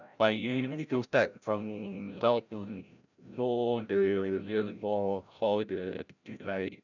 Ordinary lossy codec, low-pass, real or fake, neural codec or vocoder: none; 7.2 kHz; fake; codec, 16 kHz, 0.5 kbps, FreqCodec, larger model